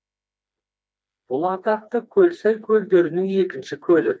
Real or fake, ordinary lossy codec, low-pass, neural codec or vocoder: fake; none; none; codec, 16 kHz, 2 kbps, FreqCodec, smaller model